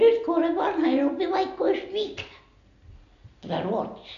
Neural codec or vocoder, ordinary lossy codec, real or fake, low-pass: none; none; real; 7.2 kHz